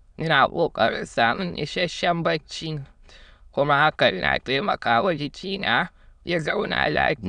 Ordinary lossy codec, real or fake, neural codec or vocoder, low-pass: none; fake; autoencoder, 22.05 kHz, a latent of 192 numbers a frame, VITS, trained on many speakers; 9.9 kHz